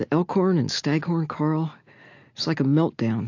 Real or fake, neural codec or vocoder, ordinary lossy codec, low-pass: real; none; AAC, 48 kbps; 7.2 kHz